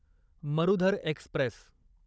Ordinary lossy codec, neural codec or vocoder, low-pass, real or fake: none; codec, 16 kHz, 16 kbps, FunCodec, trained on Chinese and English, 50 frames a second; none; fake